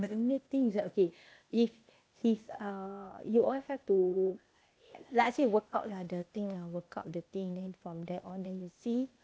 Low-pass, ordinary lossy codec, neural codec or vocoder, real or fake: none; none; codec, 16 kHz, 0.8 kbps, ZipCodec; fake